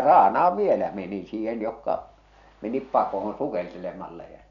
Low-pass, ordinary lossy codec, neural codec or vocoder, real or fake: 7.2 kHz; none; none; real